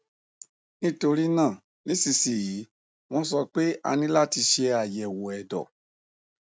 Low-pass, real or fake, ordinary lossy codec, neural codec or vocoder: none; real; none; none